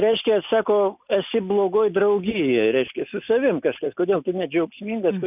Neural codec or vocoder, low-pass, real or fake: none; 3.6 kHz; real